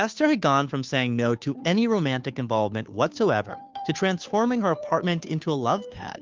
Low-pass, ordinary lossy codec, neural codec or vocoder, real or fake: 7.2 kHz; Opus, 24 kbps; codec, 16 kHz, 2 kbps, FunCodec, trained on Chinese and English, 25 frames a second; fake